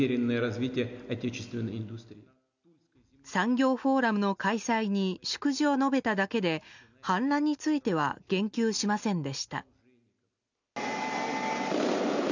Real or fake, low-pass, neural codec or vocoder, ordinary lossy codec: real; 7.2 kHz; none; none